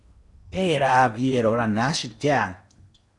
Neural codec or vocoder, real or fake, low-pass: codec, 16 kHz in and 24 kHz out, 0.8 kbps, FocalCodec, streaming, 65536 codes; fake; 10.8 kHz